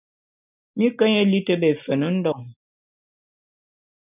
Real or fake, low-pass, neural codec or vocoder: real; 3.6 kHz; none